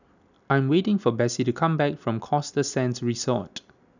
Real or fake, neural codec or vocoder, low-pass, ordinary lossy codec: real; none; 7.2 kHz; none